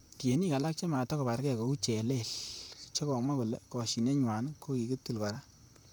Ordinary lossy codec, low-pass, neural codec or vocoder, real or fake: none; none; vocoder, 44.1 kHz, 128 mel bands, Pupu-Vocoder; fake